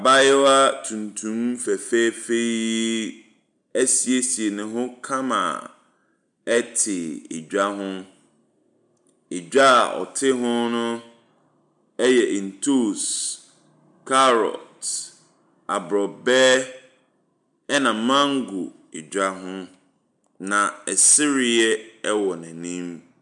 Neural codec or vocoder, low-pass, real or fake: none; 10.8 kHz; real